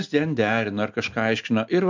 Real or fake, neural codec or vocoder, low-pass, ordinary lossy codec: real; none; 7.2 kHz; MP3, 64 kbps